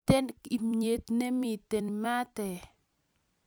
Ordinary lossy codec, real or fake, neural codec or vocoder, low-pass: none; fake; vocoder, 44.1 kHz, 128 mel bands every 256 samples, BigVGAN v2; none